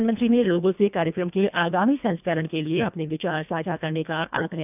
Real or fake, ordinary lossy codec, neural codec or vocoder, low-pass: fake; none; codec, 24 kHz, 1.5 kbps, HILCodec; 3.6 kHz